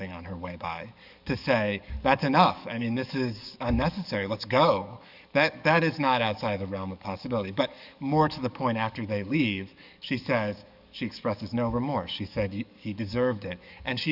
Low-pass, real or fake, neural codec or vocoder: 5.4 kHz; fake; codec, 44.1 kHz, 7.8 kbps, DAC